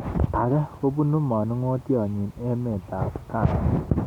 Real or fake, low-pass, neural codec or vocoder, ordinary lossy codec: real; 19.8 kHz; none; none